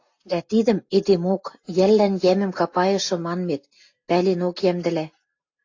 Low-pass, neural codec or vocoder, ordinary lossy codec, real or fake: 7.2 kHz; none; AAC, 48 kbps; real